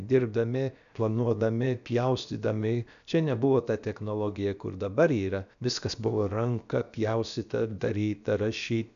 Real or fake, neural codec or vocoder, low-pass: fake; codec, 16 kHz, about 1 kbps, DyCAST, with the encoder's durations; 7.2 kHz